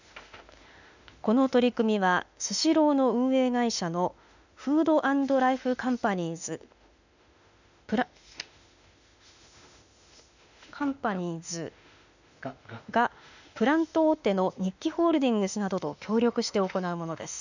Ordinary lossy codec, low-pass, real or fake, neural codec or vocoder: none; 7.2 kHz; fake; autoencoder, 48 kHz, 32 numbers a frame, DAC-VAE, trained on Japanese speech